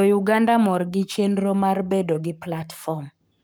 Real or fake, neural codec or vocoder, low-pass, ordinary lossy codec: fake; codec, 44.1 kHz, 7.8 kbps, Pupu-Codec; none; none